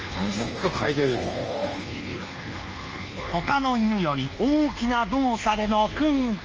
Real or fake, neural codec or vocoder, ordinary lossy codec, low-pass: fake; codec, 24 kHz, 1.2 kbps, DualCodec; Opus, 24 kbps; 7.2 kHz